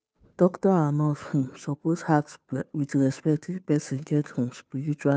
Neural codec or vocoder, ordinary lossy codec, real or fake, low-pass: codec, 16 kHz, 2 kbps, FunCodec, trained on Chinese and English, 25 frames a second; none; fake; none